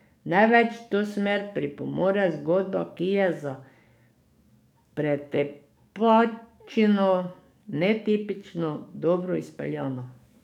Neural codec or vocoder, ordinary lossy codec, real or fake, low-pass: codec, 44.1 kHz, 7.8 kbps, DAC; none; fake; 19.8 kHz